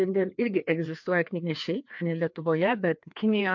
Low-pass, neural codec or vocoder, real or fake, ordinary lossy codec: 7.2 kHz; codec, 16 kHz, 2 kbps, FreqCodec, larger model; fake; MP3, 48 kbps